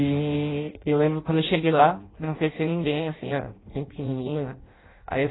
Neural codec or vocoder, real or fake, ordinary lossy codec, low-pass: codec, 16 kHz in and 24 kHz out, 0.6 kbps, FireRedTTS-2 codec; fake; AAC, 16 kbps; 7.2 kHz